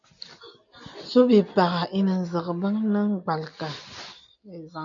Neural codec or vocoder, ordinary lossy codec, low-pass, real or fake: none; MP3, 64 kbps; 7.2 kHz; real